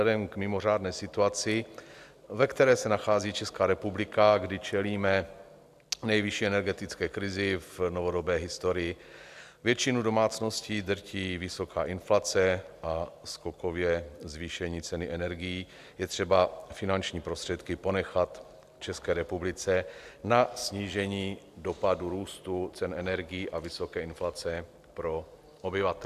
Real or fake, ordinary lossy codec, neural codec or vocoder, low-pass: real; AAC, 96 kbps; none; 14.4 kHz